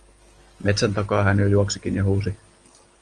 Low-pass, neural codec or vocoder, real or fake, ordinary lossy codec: 9.9 kHz; none; real; Opus, 24 kbps